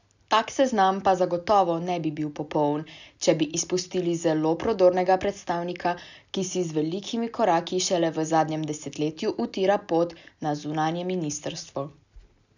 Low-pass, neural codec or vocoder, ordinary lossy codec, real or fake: 7.2 kHz; none; none; real